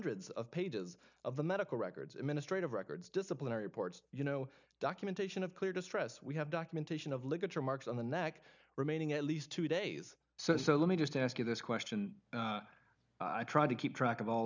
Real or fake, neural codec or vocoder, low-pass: real; none; 7.2 kHz